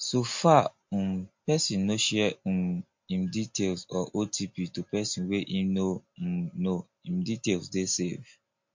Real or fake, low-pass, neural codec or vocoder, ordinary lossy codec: real; 7.2 kHz; none; MP3, 48 kbps